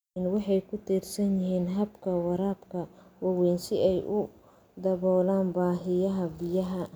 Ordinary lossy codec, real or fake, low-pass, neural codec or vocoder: none; real; none; none